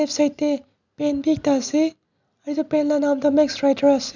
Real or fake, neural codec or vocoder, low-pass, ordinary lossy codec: real; none; 7.2 kHz; none